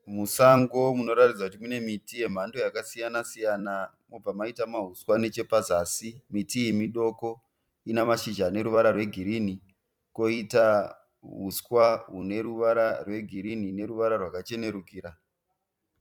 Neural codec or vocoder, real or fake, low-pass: vocoder, 44.1 kHz, 128 mel bands every 256 samples, BigVGAN v2; fake; 19.8 kHz